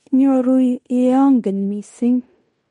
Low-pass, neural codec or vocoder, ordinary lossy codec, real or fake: 10.8 kHz; codec, 16 kHz in and 24 kHz out, 0.9 kbps, LongCat-Audio-Codec, fine tuned four codebook decoder; MP3, 48 kbps; fake